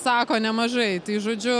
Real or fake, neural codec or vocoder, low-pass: real; none; 9.9 kHz